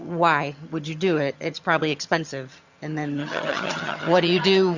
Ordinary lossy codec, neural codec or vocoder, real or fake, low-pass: Opus, 64 kbps; vocoder, 22.05 kHz, 80 mel bands, HiFi-GAN; fake; 7.2 kHz